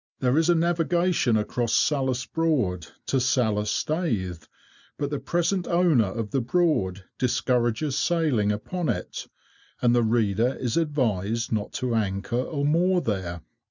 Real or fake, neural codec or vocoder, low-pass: real; none; 7.2 kHz